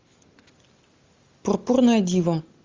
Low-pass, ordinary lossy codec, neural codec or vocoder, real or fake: 7.2 kHz; Opus, 32 kbps; none; real